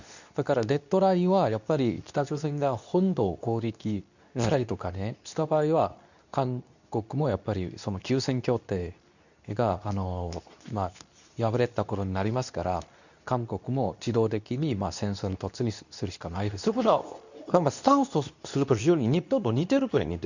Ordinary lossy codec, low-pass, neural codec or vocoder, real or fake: none; 7.2 kHz; codec, 24 kHz, 0.9 kbps, WavTokenizer, medium speech release version 2; fake